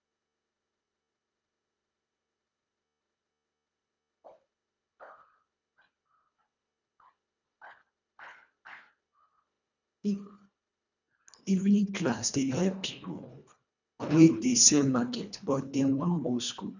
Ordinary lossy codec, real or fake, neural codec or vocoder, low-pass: none; fake; codec, 24 kHz, 1.5 kbps, HILCodec; 7.2 kHz